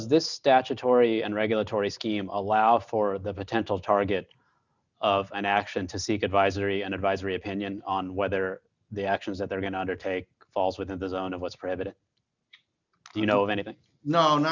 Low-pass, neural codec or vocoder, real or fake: 7.2 kHz; none; real